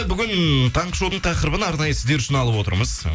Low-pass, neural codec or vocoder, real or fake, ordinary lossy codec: none; none; real; none